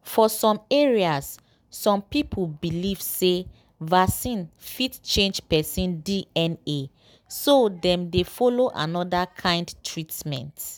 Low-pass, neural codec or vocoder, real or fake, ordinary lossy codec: none; none; real; none